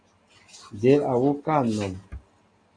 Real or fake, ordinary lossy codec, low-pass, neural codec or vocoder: real; AAC, 48 kbps; 9.9 kHz; none